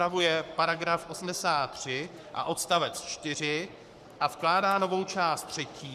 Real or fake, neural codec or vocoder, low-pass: fake; codec, 44.1 kHz, 7.8 kbps, Pupu-Codec; 14.4 kHz